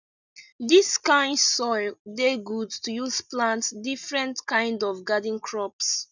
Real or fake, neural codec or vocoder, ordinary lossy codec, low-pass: real; none; none; 7.2 kHz